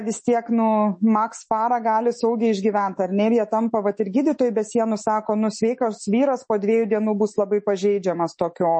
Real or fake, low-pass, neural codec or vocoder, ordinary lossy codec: fake; 10.8 kHz; autoencoder, 48 kHz, 128 numbers a frame, DAC-VAE, trained on Japanese speech; MP3, 32 kbps